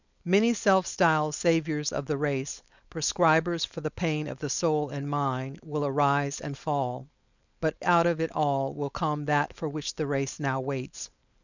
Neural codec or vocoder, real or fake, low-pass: codec, 16 kHz, 4.8 kbps, FACodec; fake; 7.2 kHz